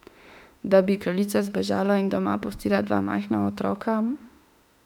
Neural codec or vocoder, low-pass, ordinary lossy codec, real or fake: autoencoder, 48 kHz, 32 numbers a frame, DAC-VAE, trained on Japanese speech; 19.8 kHz; none; fake